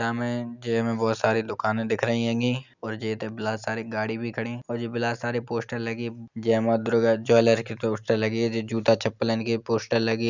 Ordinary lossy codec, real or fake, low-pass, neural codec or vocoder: none; real; 7.2 kHz; none